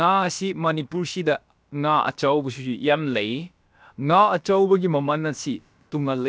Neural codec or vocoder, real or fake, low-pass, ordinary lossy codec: codec, 16 kHz, about 1 kbps, DyCAST, with the encoder's durations; fake; none; none